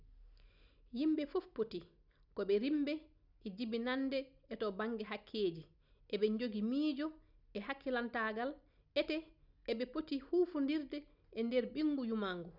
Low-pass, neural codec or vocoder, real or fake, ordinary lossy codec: 5.4 kHz; none; real; none